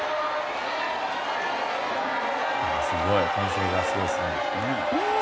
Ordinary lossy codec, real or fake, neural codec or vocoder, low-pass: none; real; none; none